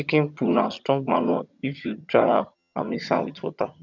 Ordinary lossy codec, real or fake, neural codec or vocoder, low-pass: none; fake; vocoder, 22.05 kHz, 80 mel bands, HiFi-GAN; 7.2 kHz